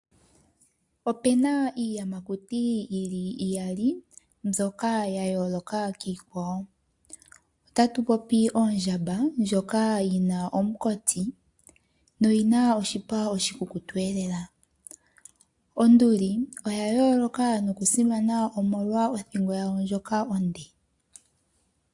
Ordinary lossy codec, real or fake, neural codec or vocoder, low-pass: AAC, 64 kbps; real; none; 10.8 kHz